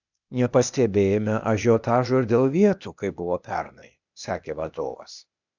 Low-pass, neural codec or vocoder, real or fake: 7.2 kHz; codec, 16 kHz, 0.8 kbps, ZipCodec; fake